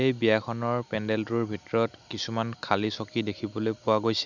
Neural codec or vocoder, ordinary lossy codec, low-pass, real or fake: none; none; 7.2 kHz; real